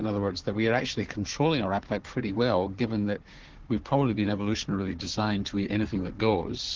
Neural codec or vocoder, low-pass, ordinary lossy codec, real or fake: codec, 16 kHz, 4 kbps, FunCodec, trained on Chinese and English, 50 frames a second; 7.2 kHz; Opus, 16 kbps; fake